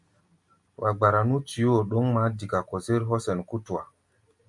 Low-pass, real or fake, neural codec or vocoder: 10.8 kHz; real; none